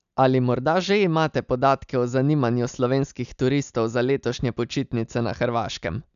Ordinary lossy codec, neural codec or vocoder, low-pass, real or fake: none; none; 7.2 kHz; real